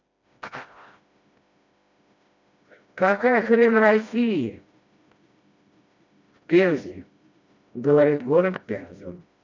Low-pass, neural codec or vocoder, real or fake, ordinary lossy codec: 7.2 kHz; codec, 16 kHz, 1 kbps, FreqCodec, smaller model; fake; MP3, 48 kbps